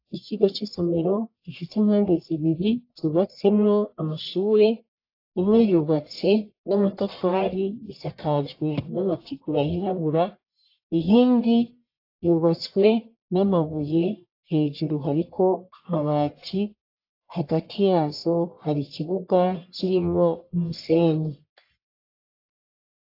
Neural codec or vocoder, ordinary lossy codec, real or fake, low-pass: codec, 44.1 kHz, 1.7 kbps, Pupu-Codec; AAC, 32 kbps; fake; 5.4 kHz